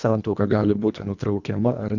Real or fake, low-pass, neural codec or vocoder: fake; 7.2 kHz; codec, 24 kHz, 1.5 kbps, HILCodec